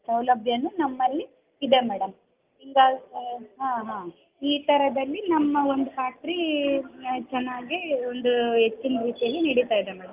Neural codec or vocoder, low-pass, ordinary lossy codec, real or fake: none; 3.6 kHz; Opus, 24 kbps; real